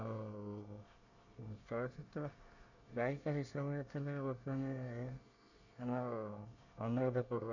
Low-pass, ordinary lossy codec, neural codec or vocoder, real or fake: 7.2 kHz; none; codec, 24 kHz, 1 kbps, SNAC; fake